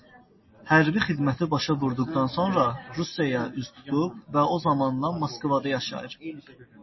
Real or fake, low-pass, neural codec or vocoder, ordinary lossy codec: real; 7.2 kHz; none; MP3, 24 kbps